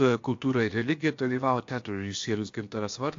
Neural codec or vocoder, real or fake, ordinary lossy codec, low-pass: codec, 16 kHz, about 1 kbps, DyCAST, with the encoder's durations; fake; AAC, 48 kbps; 7.2 kHz